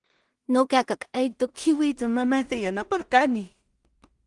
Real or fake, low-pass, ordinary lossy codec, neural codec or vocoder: fake; 10.8 kHz; Opus, 24 kbps; codec, 16 kHz in and 24 kHz out, 0.4 kbps, LongCat-Audio-Codec, two codebook decoder